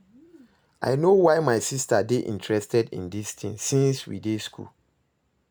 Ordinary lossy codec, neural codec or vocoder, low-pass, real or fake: none; none; none; real